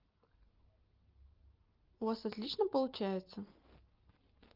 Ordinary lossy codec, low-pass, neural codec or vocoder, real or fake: Opus, 32 kbps; 5.4 kHz; none; real